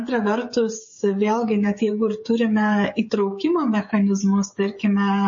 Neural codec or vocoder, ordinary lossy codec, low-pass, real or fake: codec, 16 kHz, 16 kbps, FreqCodec, smaller model; MP3, 32 kbps; 7.2 kHz; fake